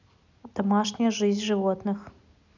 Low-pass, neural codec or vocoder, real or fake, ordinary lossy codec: 7.2 kHz; none; real; none